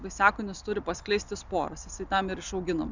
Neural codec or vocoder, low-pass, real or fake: none; 7.2 kHz; real